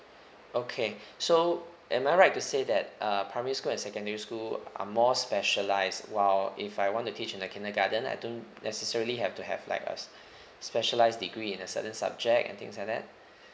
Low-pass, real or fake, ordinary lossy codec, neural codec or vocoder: none; real; none; none